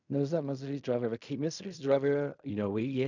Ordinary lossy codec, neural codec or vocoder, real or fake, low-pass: none; codec, 16 kHz in and 24 kHz out, 0.4 kbps, LongCat-Audio-Codec, fine tuned four codebook decoder; fake; 7.2 kHz